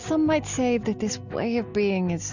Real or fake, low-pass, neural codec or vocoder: real; 7.2 kHz; none